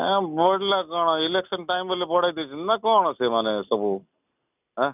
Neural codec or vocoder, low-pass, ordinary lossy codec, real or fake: none; 3.6 kHz; none; real